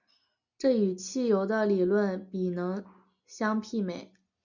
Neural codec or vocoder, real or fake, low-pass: none; real; 7.2 kHz